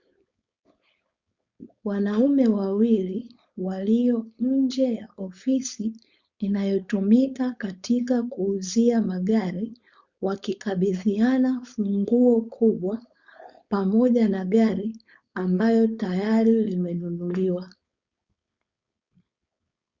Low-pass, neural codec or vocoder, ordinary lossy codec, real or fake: 7.2 kHz; codec, 16 kHz, 4.8 kbps, FACodec; Opus, 64 kbps; fake